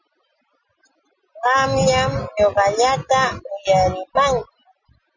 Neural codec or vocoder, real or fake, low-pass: none; real; 7.2 kHz